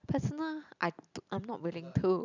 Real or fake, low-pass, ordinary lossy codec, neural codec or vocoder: real; 7.2 kHz; none; none